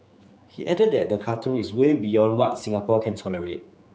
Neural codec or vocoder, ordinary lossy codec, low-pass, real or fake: codec, 16 kHz, 4 kbps, X-Codec, HuBERT features, trained on general audio; none; none; fake